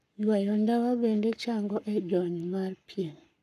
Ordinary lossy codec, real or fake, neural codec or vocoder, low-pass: none; fake; codec, 44.1 kHz, 7.8 kbps, Pupu-Codec; 14.4 kHz